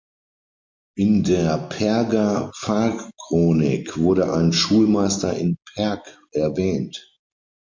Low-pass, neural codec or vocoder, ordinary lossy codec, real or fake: 7.2 kHz; none; MP3, 48 kbps; real